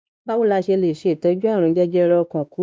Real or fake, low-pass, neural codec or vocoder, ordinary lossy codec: fake; none; codec, 16 kHz, 2 kbps, X-Codec, WavLM features, trained on Multilingual LibriSpeech; none